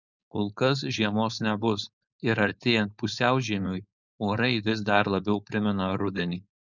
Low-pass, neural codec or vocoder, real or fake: 7.2 kHz; codec, 16 kHz, 4.8 kbps, FACodec; fake